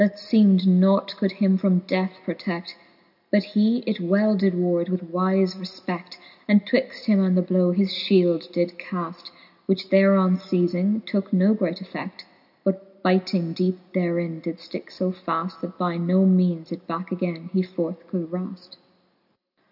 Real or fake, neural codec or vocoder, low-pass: real; none; 5.4 kHz